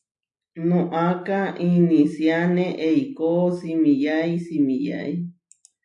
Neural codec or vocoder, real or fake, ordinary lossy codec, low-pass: none; real; AAC, 64 kbps; 10.8 kHz